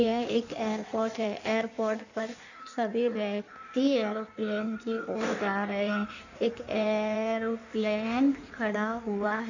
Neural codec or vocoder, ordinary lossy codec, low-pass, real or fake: codec, 16 kHz in and 24 kHz out, 1.1 kbps, FireRedTTS-2 codec; none; 7.2 kHz; fake